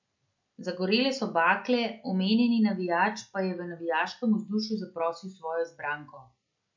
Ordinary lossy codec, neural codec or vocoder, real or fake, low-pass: MP3, 64 kbps; none; real; 7.2 kHz